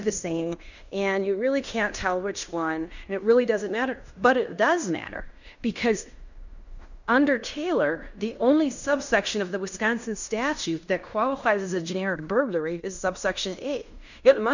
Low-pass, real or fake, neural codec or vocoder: 7.2 kHz; fake; codec, 16 kHz in and 24 kHz out, 0.9 kbps, LongCat-Audio-Codec, fine tuned four codebook decoder